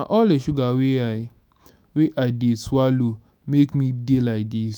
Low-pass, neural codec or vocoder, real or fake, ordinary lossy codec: none; autoencoder, 48 kHz, 128 numbers a frame, DAC-VAE, trained on Japanese speech; fake; none